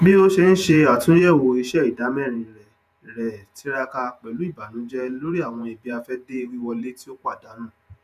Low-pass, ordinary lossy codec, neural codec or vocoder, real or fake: 14.4 kHz; none; vocoder, 48 kHz, 128 mel bands, Vocos; fake